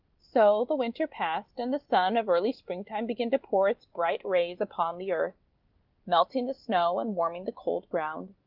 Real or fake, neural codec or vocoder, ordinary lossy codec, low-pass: real; none; Opus, 32 kbps; 5.4 kHz